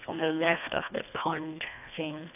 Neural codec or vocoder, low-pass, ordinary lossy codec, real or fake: codec, 24 kHz, 1.5 kbps, HILCodec; 3.6 kHz; none; fake